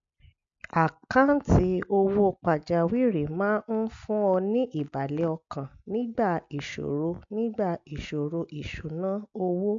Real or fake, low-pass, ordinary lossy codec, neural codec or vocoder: real; 7.2 kHz; MP3, 64 kbps; none